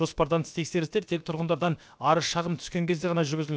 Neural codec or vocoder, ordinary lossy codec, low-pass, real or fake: codec, 16 kHz, about 1 kbps, DyCAST, with the encoder's durations; none; none; fake